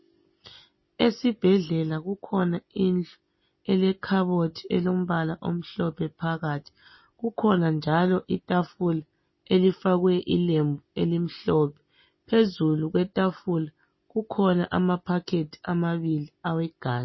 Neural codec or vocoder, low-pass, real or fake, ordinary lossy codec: none; 7.2 kHz; real; MP3, 24 kbps